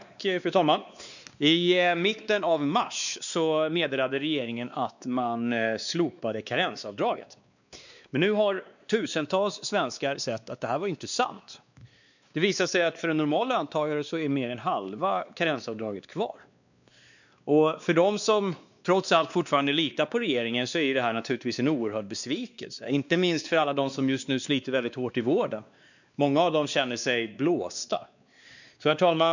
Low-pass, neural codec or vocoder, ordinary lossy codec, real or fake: 7.2 kHz; codec, 16 kHz, 2 kbps, X-Codec, WavLM features, trained on Multilingual LibriSpeech; none; fake